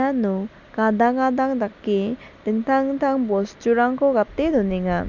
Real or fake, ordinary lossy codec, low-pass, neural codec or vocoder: real; none; 7.2 kHz; none